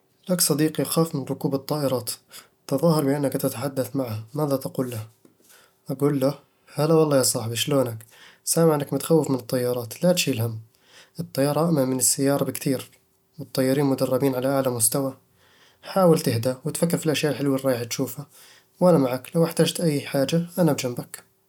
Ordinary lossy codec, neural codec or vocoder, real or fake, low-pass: none; none; real; 19.8 kHz